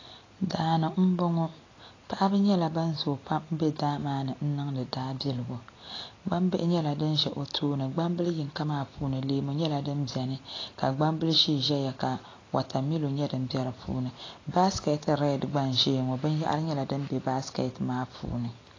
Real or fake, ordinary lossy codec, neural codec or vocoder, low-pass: real; AAC, 32 kbps; none; 7.2 kHz